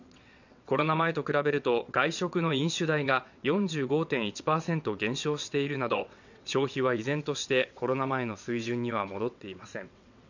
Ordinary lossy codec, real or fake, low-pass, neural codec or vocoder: none; fake; 7.2 kHz; vocoder, 22.05 kHz, 80 mel bands, WaveNeXt